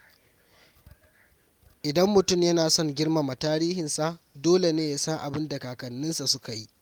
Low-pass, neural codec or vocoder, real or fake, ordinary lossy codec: 19.8 kHz; none; real; Opus, 32 kbps